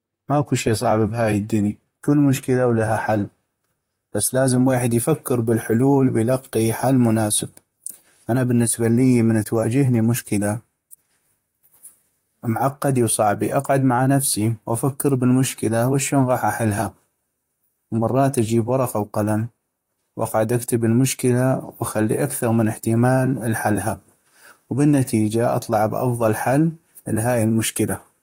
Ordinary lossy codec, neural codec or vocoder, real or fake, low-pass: AAC, 48 kbps; vocoder, 44.1 kHz, 128 mel bands, Pupu-Vocoder; fake; 19.8 kHz